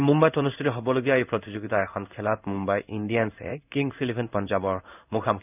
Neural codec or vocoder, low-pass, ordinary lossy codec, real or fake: codec, 16 kHz in and 24 kHz out, 1 kbps, XY-Tokenizer; 3.6 kHz; none; fake